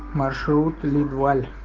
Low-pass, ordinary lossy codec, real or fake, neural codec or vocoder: 7.2 kHz; Opus, 16 kbps; real; none